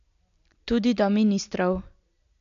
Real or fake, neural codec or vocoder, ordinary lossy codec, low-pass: real; none; MP3, 64 kbps; 7.2 kHz